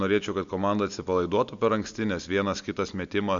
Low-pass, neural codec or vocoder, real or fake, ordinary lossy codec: 7.2 kHz; none; real; Opus, 64 kbps